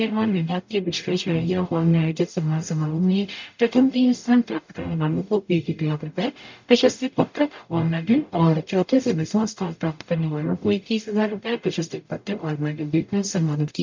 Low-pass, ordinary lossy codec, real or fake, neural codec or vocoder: 7.2 kHz; MP3, 64 kbps; fake; codec, 44.1 kHz, 0.9 kbps, DAC